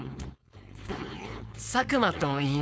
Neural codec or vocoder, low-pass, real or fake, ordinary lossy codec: codec, 16 kHz, 4.8 kbps, FACodec; none; fake; none